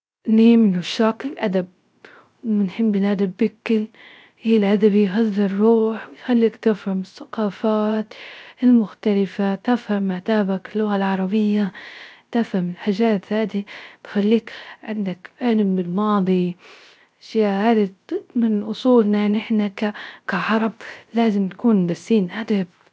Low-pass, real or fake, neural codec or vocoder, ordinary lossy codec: none; fake; codec, 16 kHz, 0.3 kbps, FocalCodec; none